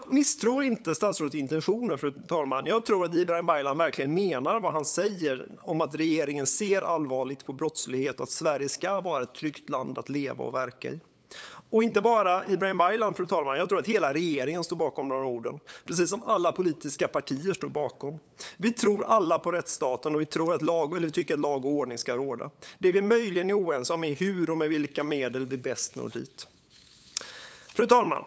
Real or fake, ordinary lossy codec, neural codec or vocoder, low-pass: fake; none; codec, 16 kHz, 8 kbps, FunCodec, trained on LibriTTS, 25 frames a second; none